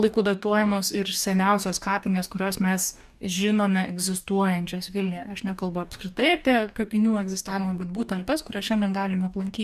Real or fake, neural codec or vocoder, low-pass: fake; codec, 44.1 kHz, 2.6 kbps, DAC; 14.4 kHz